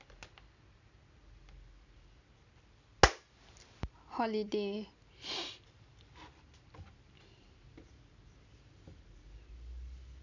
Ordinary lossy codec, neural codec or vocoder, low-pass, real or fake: none; none; 7.2 kHz; real